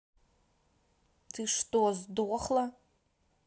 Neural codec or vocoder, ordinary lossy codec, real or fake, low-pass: none; none; real; none